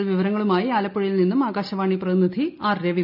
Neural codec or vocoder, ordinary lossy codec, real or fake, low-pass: none; none; real; 5.4 kHz